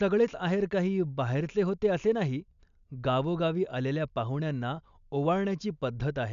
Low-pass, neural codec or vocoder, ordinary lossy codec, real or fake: 7.2 kHz; none; none; real